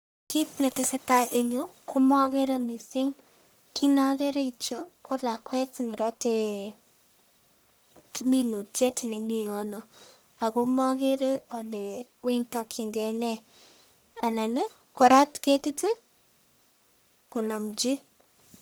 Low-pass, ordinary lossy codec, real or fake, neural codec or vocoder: none; none; fake; codec, 44.1 kHz, 1.7 kbps, Pupu-Codec